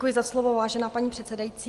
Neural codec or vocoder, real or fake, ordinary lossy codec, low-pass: none; real; Opus, 24 kbps; 10.8 kHz